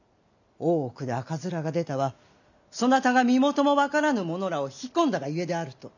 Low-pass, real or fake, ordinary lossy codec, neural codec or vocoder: 7.2 kHz; real; none; none